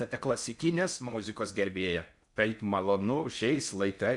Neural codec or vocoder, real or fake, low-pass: codec, 16 kHz in and 24 kHz out, 0.6 kbps, FocalCodec, streaming, 4096 codes; fake; 10.8 kHz